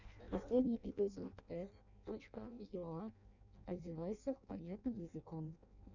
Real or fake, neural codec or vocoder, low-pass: fake; codec, 16 kHz in and 24 kHz out, 0.6 kbps, FireRedTTS-2 codec; 7.2 kHz